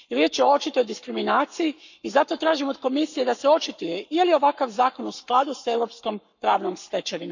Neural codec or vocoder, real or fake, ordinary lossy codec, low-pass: codec, 44.1 kHz, 7.8 kbps, Pupu-Codec; fake; none; 7.2 kHz